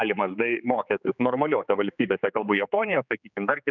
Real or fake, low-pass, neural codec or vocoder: fake; 7.2 kHz; codec, 16 kHz, 4 kbps, X-Codec, HuBERT features, trained on general audio